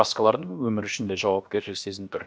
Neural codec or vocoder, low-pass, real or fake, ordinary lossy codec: codec, 16 kHz, about 1 kbps, DyCAST, with the encoder's durations; none; fake; none